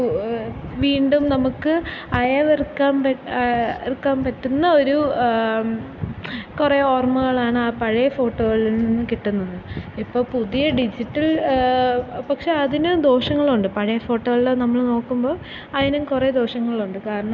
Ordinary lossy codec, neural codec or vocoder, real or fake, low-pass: none; none; real; none